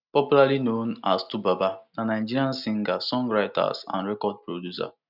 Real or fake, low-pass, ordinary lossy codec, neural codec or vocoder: real; 5.4 kHz; none; none